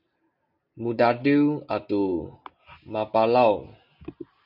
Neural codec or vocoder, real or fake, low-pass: none; real; 5.4 kHz